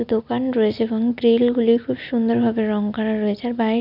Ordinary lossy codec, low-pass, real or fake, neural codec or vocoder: none; 5.4 kHz; real; none